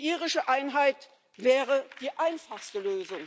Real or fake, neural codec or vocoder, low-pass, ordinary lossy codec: real; none; none; none